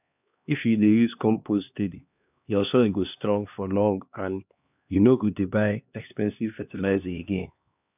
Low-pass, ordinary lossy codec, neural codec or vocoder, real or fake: 3.6 kHz; none; codec, 16 kHz, 2 kbps, X-Codec, HuBERT features, trained on LibriSpeech; fake